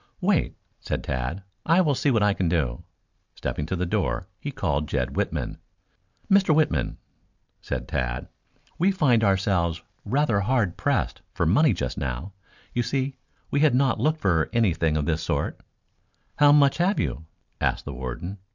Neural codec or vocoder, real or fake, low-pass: none; real; 7.2 kHz